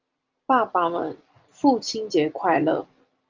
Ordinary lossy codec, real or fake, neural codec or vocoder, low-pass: Opus, 32 kbps; real; none; 7.2 kHz